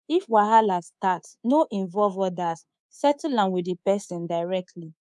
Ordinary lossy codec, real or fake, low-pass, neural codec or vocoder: none; fake; none; codec, 24 kHz, 3.1 kbps, DualCodec